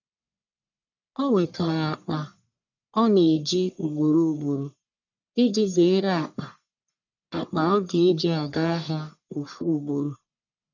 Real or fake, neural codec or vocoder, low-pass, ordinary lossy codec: fake; codec, 44.1 kHz, 1.7 kbps, Pupu-Codec; 7.2 kHz; none